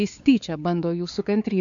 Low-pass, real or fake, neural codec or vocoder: 7.2 kHz; fake; codec, 16 kHz, 4 kbps, X-Codec, WavLM features, trained on Multilingual LibriSpeech